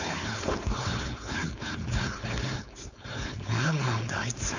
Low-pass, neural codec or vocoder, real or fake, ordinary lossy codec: 7.2 kHz; codec, 16 kHz, 4.8 kbps, FACodec; fake; none